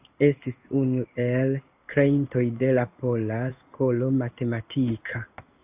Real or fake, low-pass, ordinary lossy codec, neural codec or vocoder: fake; 3.6 kHz; AAC, 32 kbps; codec, 16 kHz in and 24 kHz out, 1 kbps, XY-Tokenizer